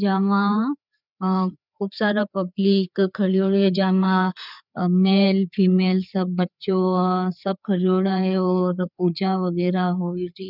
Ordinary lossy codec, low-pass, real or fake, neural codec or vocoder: none; 5.4 kHz; fake; codec, 16 kHz, 4 kbps, FreqCodec, larger model